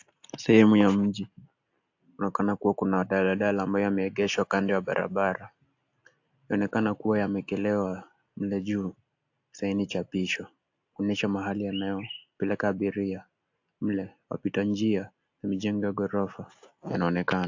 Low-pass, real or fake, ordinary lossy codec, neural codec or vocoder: 7.2 kHz; real; AAC, 48 kbps; none